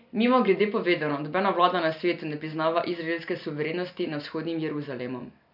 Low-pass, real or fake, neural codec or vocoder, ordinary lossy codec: 5.4 kHz; real; none; none